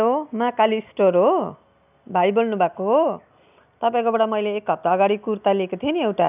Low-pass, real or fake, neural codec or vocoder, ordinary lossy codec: 3.6 kHz; real; none; none